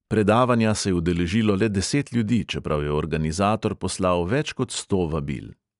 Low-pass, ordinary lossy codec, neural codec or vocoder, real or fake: 10.8 kHz; none; none; real